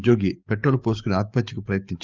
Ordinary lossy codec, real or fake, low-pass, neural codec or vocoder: Opus, 24 kbps; fake; 7.2 kHz; codec, 44.1 kHz, 7.8 kbps, DAC